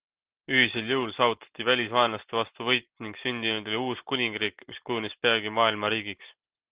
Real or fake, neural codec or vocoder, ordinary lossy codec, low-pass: real; none; Opus, 16 kbps; 3.6 kHz